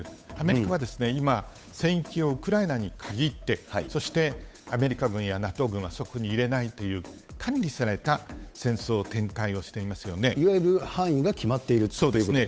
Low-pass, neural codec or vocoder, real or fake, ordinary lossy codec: none; codec, 16 kHz, 8 kbps, FunCodec, trained on Chinese and English, 25 frames a second; fake; none